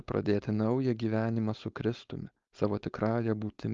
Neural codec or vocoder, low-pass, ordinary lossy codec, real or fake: codec, 16 kHz, 4.8 kbps, FACodec; 7.2 kHz; Opus, 32 kbps; fake